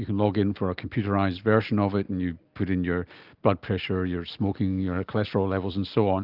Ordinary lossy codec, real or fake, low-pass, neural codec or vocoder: Opus, 32 kbps; real; 5.4 kHz; none